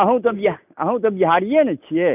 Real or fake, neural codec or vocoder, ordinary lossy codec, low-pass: real; none; none; 3.6 kHz